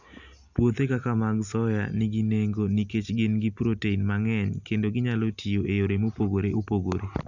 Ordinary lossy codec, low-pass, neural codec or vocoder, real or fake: none; 7.2 kHz; none; real